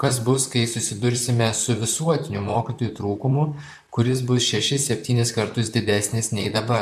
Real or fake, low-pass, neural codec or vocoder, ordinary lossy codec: fake; 14.4 kHz; vocoder, 44.1 kHz, 128 mel bands, Pupu-Vocoder; AAC, 96 kbps